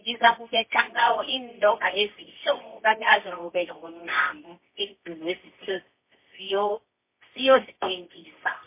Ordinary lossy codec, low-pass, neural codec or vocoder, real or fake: MP3, 24 kbps; 3.6 kHz; codec, 24 kHz, 0.9 kbps, WavTokenizer, medium music audio release; fake